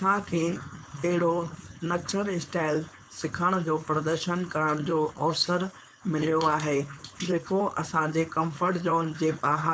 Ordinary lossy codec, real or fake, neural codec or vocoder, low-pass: none; fake; codec, 16 kHz, 4.8 kbps, FACodec; none